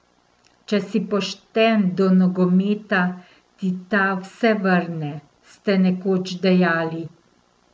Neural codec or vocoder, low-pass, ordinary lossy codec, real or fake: none; none; none; real